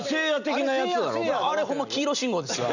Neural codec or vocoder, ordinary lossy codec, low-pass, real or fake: none; none; 7.2 kHz; real